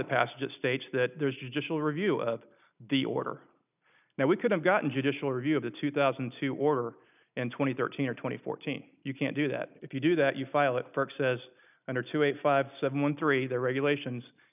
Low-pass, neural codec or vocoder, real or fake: 3.6 kHz; none; real